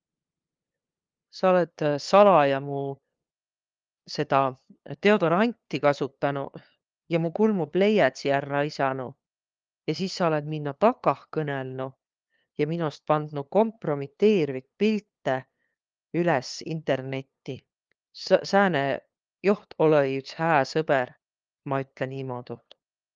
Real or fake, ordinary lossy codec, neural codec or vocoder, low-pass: fake; Opus, 24 kbps; codec, 16 kHz, 2 kbps, FunCodec, trained on LibriTTS, 25 frames a second; 7.2 kHz